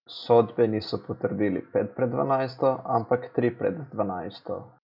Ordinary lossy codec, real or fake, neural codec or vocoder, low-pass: none; real; none; 5.4 kHz